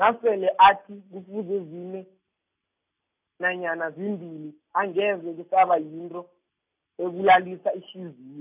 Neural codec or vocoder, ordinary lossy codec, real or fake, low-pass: none; none; real; 3.6 kHz